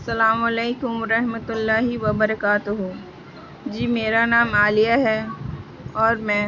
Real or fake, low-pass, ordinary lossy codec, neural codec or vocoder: real; 7.2 kHz; none; none